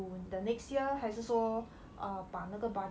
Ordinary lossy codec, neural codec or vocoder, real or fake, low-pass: none; none; real; none